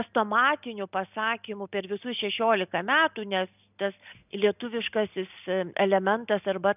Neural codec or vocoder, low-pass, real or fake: none; 3.6 kHz; real